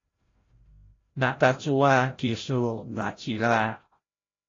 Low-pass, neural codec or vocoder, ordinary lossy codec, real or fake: 7.2 kHz; codec, 16 kHz, 0.5 kbps, FreqCodec, larger model; AAC, 32 kbps; fake